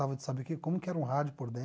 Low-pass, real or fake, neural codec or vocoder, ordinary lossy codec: none; real; none; none